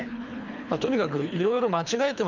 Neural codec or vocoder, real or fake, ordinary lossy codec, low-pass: codec, 24 kHz, 3 kbps, HILCodec; fake; none; 7.2 kHz